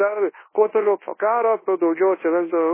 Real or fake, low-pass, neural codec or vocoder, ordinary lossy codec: fake; 3.6 kHz; codec, 24 kHz, 0.9 kbps, WavTokenizer, large speech release; MP3, 16 kbps